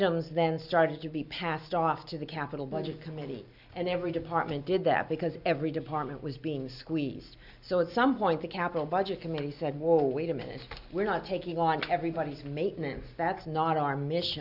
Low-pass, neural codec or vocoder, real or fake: 5.4 kHz; none; real